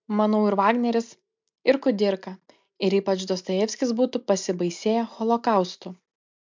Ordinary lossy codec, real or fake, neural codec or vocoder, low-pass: MP3, 64 kbps; real; none; 7.2 kHz